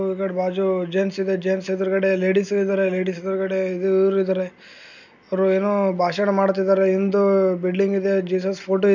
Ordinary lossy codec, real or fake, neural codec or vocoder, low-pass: none; real; none; 7.2 kHz